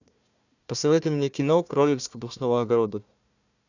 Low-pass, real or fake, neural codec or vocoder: 7.2 kHz; fake; codec, 16 kHz, 1 kbps, FunCodec, trained on Chinese and English, 50 frames a second